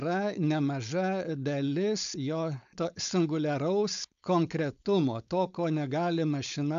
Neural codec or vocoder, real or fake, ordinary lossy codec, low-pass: codec, 16 kHz, 4.8 kbps, FACodec; fake; AAC, 96 kbps; 7.2 kHz